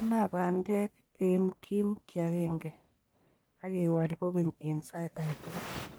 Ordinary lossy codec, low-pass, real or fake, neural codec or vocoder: none; none; fake; codec, 44.1 kHz, 1.7 kbps, Pupu-Codec